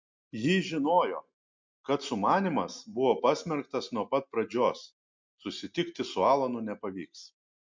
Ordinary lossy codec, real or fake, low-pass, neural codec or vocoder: MP3, 48 kbps; real; 7.2 kHz; none